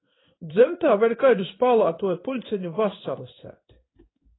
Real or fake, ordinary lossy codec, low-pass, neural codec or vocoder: fake; AAC, 16 kbps; 7.2 kHz; codec, 16 kHz in and 24 kHz out, 1 kbps, XY-Tokenizer